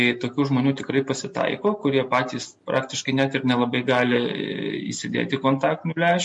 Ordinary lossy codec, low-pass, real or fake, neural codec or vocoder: MP3, 48 kbps; 10.8 kHz; real; none